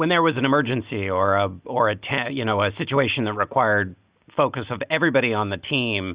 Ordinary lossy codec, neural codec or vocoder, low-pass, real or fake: Opus, 64 kbps; none; 3.6 kHz; real